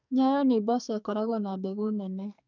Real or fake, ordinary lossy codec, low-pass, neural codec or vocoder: fake; none; 7.2 kHz; codec, 44.1 kHz, 2.6 kbps, SNAC